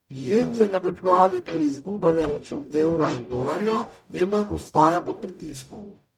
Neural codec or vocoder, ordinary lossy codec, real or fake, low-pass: codec, 44.1 kHz, 0.9 kbps, DAC; MP3, 96 kbps; fake; 19.8 kHz